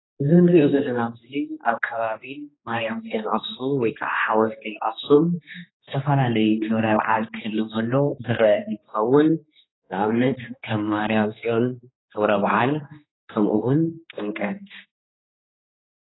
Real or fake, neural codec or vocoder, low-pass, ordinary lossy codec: fake; codec, 16 kHz, 2 kbps, X-Codec, HuBERT features, trained on general audio; 7.2 kHz; AAC, 16 kbps